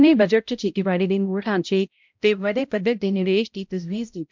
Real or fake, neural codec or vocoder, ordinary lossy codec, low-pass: fake; codec, 16 kHz, 0.5 kbps, X-Codec, HuBERT features, trained on balanced general audio; MP3, 64 kbps; 7.2 kHz